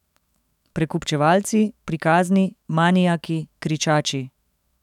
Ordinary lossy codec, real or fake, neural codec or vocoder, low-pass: none; fake; autoencoder, 48 kHz, 128 numbers a frame, DAC-VAE, trained on Japanese speech; 19.8 kHz